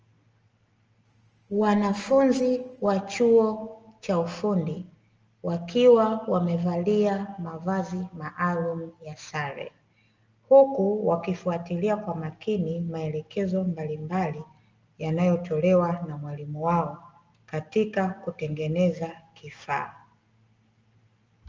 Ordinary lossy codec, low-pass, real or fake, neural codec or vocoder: Opus, 24 kbps; 7.2 kHz; real; none